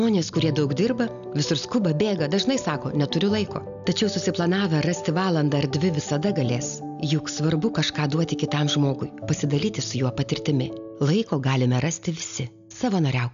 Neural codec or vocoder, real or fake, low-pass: none; real; 7.2 kHz